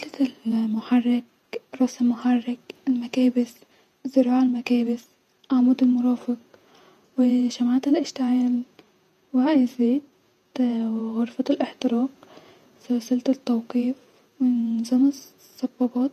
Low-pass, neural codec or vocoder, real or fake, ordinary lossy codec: 14.4 kHz; vocoder, 48 kHz, 128 mel bands, Vocos; fake; none